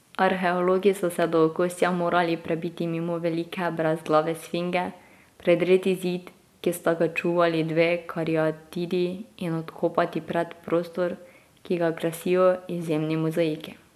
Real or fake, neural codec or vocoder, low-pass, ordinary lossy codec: real; none; 14.4 kHz; AAC, 96 kbps